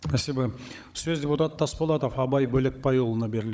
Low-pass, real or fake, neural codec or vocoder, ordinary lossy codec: none; fake; codec, 16 kHz, 16 kbps, FunCodec, trained on Chinese and English, 50 frames a second; none